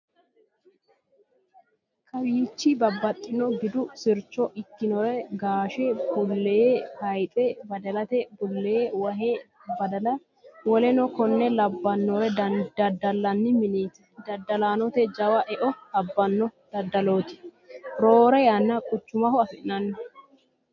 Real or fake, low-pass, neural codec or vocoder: real; 7.2 kHz; none